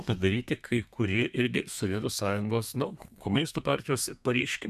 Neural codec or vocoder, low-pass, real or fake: codec, 32 kHz, 1.9 kbps, SNAC; 14.4 kHz; fake